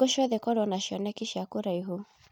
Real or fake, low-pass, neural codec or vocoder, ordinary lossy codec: real; 19.8 kHz; none; none